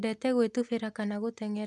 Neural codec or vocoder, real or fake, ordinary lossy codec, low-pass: none; real; none; none